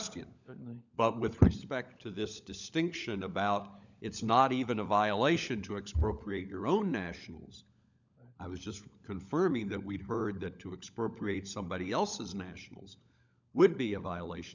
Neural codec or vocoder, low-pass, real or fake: codec, 16 kHz, 16 kbps, FunCodec, trained on LibriTTS, 50 frames a second; 7.2 kHz; fake